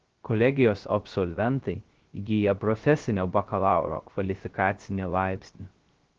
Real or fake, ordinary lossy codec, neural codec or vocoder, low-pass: fake; Opus, 16 kbps; codec, 16 kHz, 0.3 kbps, FocalCodec; 7.2 kHz